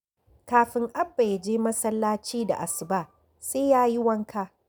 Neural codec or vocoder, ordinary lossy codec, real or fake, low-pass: none; none; real; none